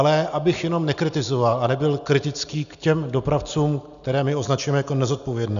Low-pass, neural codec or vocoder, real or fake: 7.2 kHz; none; real